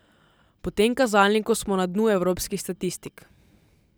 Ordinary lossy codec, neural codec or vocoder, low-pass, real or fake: none; none; none; real